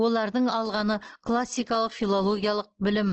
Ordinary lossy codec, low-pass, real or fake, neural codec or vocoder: Opus, 16 kbps; 7.2 kHz; real; none